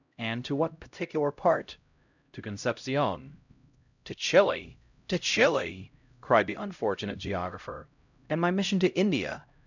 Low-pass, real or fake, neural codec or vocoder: 7.2 kHz; fake; codec, 16 kHz, 0.5 kbps, X-Codec, HuBERT features, trained on LibriSpeech